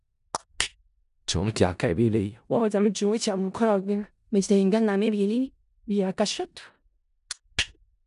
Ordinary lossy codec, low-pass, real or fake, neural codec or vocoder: none; 10.8 kHz; fake; codec, 16 kHz in and 24 kHz out, 0.4 kbps, LongCat-Audio-Codec, four codebook decoder